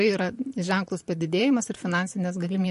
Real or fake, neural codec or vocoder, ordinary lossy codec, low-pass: fake; vocoder, 44.1 kHz, 128 mel bands, Pupu-Vocoder; MP3, 48 kbps; 14.4 kHz